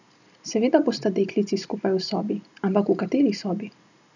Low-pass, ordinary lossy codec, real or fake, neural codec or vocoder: 7.2 kHz; none; real; none